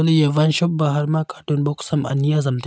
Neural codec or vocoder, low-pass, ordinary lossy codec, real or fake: none; none; none; real